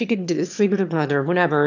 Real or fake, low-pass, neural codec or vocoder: fake; 7.2 kHz; autoencoder, 22.05 kHz, a latent of 192 numbers a frame, VITS, trained on one speaker